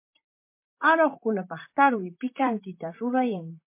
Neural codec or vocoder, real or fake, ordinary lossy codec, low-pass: vocoder, 44.1 kHz, 128 mel bands, Pupu-Vocoder; fake; MP3, 24 kbps; 3.6 kHz